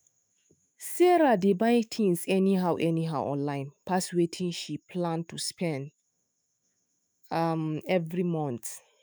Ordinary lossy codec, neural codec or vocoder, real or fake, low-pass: none; autoencoder, 48 kHz, 128 numbers a frame, DAC-VAE, trained on Japanese speech; fake; none